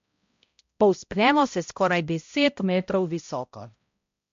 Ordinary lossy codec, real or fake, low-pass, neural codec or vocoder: MP3, 48 kbps; fake; 7.2 kHz; codec, 16 kHz, 0.5 kbps, X-Codec, HuBERT features, trained on balanced general audio